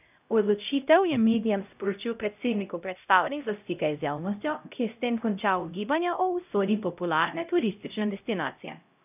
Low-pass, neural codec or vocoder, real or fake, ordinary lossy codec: 3.6 kHz; codec, 16 kHz, 0.5 kbps, X-Codec, HuBERT features, trained on LibriSpeech; fake; none